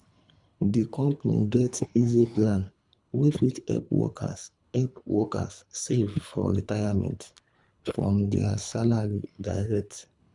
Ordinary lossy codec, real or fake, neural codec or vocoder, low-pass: none; fake; codec, 24 kHz, 3 kbps, HILCodec; 10.8 kHz